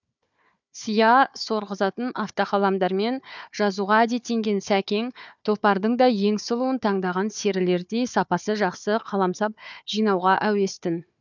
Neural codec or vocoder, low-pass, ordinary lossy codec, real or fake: codec, 16 kHz, 4 kbps, FunCodec, trained on Chinese and English, 50 frames a second; 7.2 kHz; none; fake